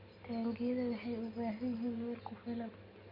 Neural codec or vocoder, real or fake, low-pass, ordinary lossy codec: none; real; 5.4 kHz; MP3, 32 kbps